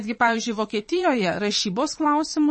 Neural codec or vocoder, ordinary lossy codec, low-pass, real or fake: vocoder, 22.05 kHz, 80 mel bands, Vocos; MP3, 32 kbps; 9.9 kHz; fake